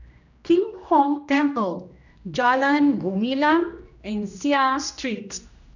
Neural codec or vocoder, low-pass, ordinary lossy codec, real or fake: codec, 16 kHz, 1 kbps, X-Codec, HuBERT features, trained on general audio; 7.2 kHz; none; fake